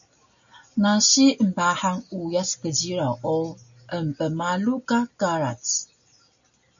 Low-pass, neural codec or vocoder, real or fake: 7.2 kHz; none; real